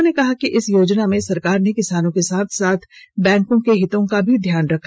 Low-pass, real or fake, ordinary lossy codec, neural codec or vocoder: none; real; none; none